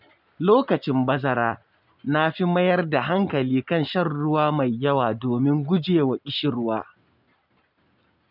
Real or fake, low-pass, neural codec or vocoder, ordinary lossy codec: real; 5.4 kHz; none; none